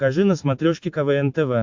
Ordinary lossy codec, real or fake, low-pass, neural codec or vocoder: MP3, 64 kbps; real; 7.2 kHz; none